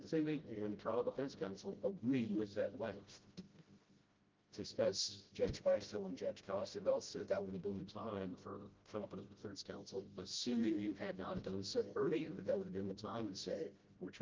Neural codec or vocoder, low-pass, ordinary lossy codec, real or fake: codec, 16 kHz, 0.5 kbps, FreqCodec, smaller model; 7.2 kHz; Opus, 24 kbps; fake